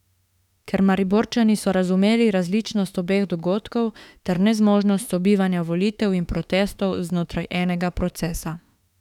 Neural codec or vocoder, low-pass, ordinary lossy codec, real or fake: autoencoder, 48 kHz, 32 numbers a frame, DAC-VAE, trained on Japanese speech; 19.8 kHz; none; fake